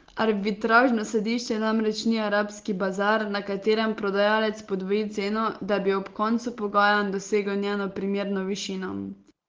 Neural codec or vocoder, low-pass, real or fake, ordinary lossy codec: none; 7.2 kHz; real; Opus, 16 kbps